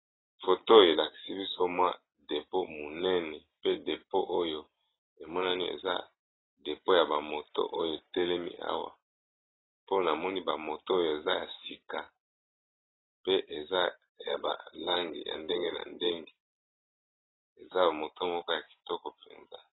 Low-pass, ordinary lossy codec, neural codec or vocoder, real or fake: 7.2 kHz; AAC, 16 kbps; none; real